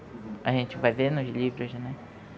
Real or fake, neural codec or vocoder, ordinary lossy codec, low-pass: real; none; none; none